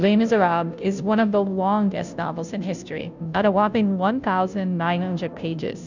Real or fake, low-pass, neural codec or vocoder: fake; 7.2 kHz; codec, 16 kHz, 0.5 kbps, FunCodec, trained on Chinese and English, 25 frames a second